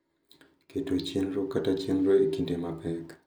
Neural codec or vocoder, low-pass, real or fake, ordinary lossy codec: none; none; real; none